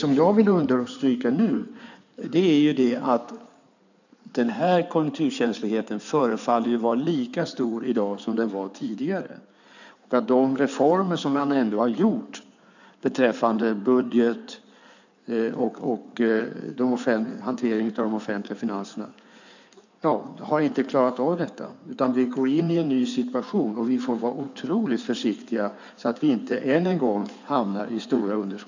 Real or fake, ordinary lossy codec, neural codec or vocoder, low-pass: fake; none; codec, 16 kHz in and 24 kHz out, 2.2 kbps, FireRedTTS-2 codec; 7.2 kHz